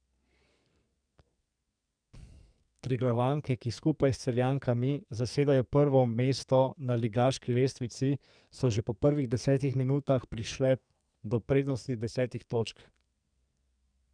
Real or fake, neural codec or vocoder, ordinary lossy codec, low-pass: fake; codec, 44.1 kHz, 2.6 kbps, SNAC; none; 9.9 kHz